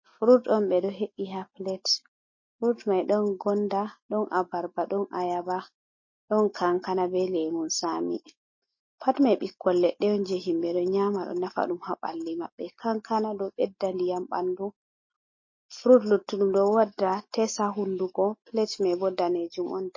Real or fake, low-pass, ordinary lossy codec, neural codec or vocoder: real; 7.2 kHz; MP3, 32 kbps; none